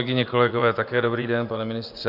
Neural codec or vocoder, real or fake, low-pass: vocoder, 24 kHz, 100 mel bands, Vocos; fake; 5.4 kHz